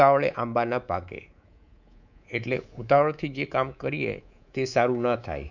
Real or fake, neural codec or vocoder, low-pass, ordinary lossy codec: fake; codec, 24 kHz, 3.1 kbps, DualCodec; 7.2 kHz; none